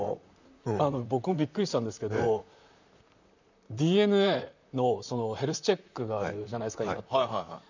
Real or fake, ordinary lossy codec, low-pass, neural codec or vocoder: fake; none; 7.2 kHz; vocoder, 44.1 kHz, 128 mel bands, Pupu-Vocoder